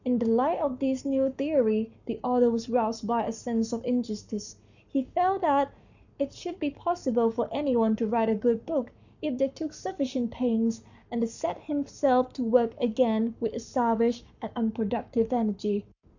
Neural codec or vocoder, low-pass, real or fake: codec, 44.1 kHz, 7.8 kbps, Pupu-Codec; 7.2 kHz; fake